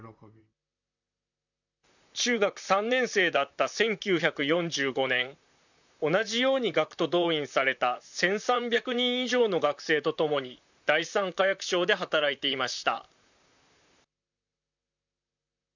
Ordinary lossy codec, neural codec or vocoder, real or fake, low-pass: none; vocoder, 44.1 kHz, 128 mel bands, Pupu-Vocoder; fake; 7.2 kHz